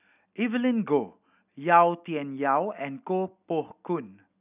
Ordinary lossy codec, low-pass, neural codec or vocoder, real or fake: none; 3.6 kHz; none; real